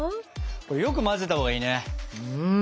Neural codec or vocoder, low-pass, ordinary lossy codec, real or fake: none; none; none; real